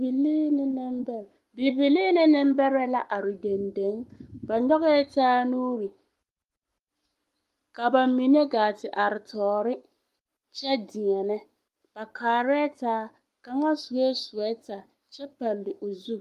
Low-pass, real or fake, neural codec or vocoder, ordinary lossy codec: 14.4 kHz; fake; codec, 44.1 kHz, 7.8 kbps, Pupu-Codec; Opus, 32 kbps